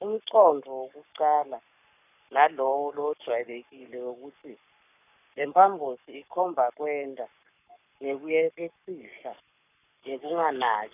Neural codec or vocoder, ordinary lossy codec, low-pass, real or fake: codec, 44.1 kHz, 7.8 kbps, Pupu-Codec; none; 3.6 kHz; fake